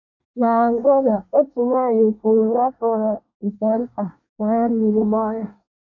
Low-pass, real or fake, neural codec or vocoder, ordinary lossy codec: 7.2 kHz; fake; codec, 24 kHz, 1 kbps, SNAC; none